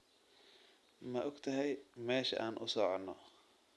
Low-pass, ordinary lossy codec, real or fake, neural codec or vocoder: none; none; real; none